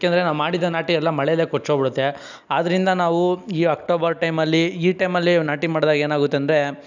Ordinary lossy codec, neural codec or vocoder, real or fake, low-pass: none; none; real; 7.2 kHz